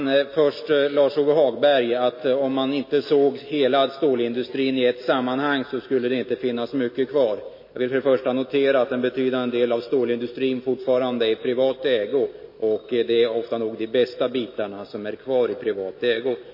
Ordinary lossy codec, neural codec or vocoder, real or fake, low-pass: MP3, 24 kbps; none; real; 5.4 kHz